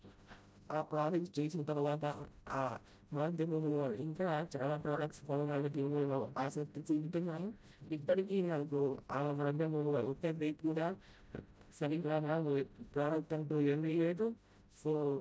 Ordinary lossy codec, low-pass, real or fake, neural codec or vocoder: none; none; fake; codec, 16 kHz, 0.5 kbps, FreqCodec, smaller model